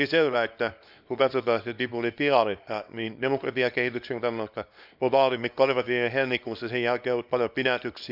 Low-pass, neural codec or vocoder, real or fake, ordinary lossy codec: 5.4 kHz; codec, 24 kHz, 0.9 kbps, WavTokenizer, small release; fake; none